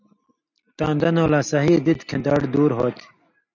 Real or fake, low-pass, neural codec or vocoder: real; 7.2 kHz; none